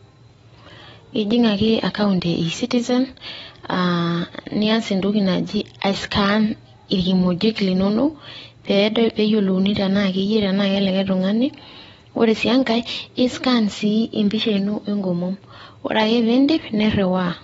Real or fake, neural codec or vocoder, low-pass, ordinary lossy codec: real; none; 19.8 kHz; AAC, 24 kbps